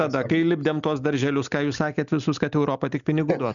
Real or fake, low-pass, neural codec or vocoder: real; 7.2 kHz; none